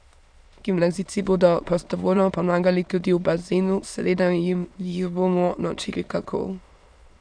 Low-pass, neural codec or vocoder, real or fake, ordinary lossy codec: 9.9 kHz; autoencoder, 22.05 kHz, a latent of 192 numbers a frame, VITS, trained on many speakers; fake; none